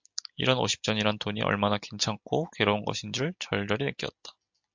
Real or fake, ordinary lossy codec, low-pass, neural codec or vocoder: real; MP3, 64 kbps; 7.2 kHz; none